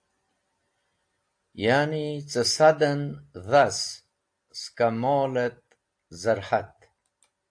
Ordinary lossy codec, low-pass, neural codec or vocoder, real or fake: AAC, 64 kbps; 9.9 kHz; none; real